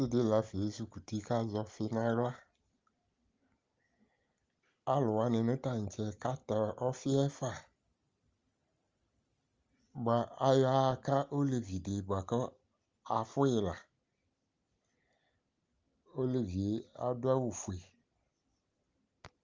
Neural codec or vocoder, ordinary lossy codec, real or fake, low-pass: none; Opus, 32 kbps; real; 7.2 kHz